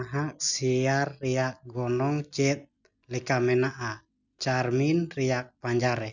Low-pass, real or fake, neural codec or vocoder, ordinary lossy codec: 7.2 kHz; real; none; none